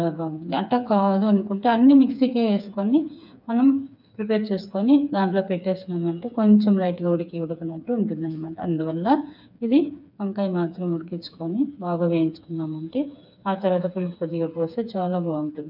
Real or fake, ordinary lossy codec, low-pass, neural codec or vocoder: fake; none; 5.4 kHz; codec, 16 kHz, 4 kbps, FreqCodec, smaller model